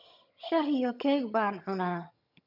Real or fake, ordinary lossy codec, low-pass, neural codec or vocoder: fake; none; 5.4 kHz; vocoder, 22.05 kHz, 80 mel bands, HiFi-GAN